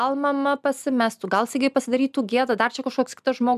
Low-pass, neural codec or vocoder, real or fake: 14.4 kHz; none; real